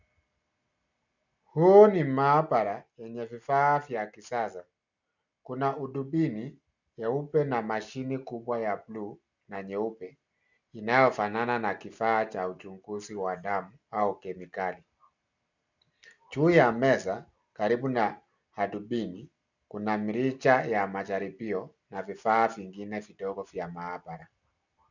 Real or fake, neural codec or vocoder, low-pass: real; none; 7.2 kHz